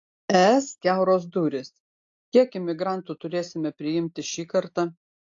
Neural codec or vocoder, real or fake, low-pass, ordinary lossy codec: none; real; 7.2 kHz; AAC, 48 kbps